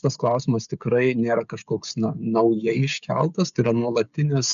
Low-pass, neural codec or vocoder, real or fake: 7.2 kHz; codec, 16 kHz, 16 kbps, FreqCodec, smaller model; fake